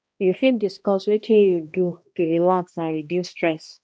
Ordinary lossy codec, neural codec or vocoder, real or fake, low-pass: none; codec, 16 kHz, 1 kbps, X-Codec, HuBERT features, trained on balanced general audio; fake; none